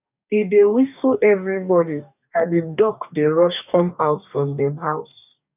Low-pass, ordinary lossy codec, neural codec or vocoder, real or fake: 3.6 kHz; none; codec, 44.1 kHz, 2.6 kbps, DAC; fake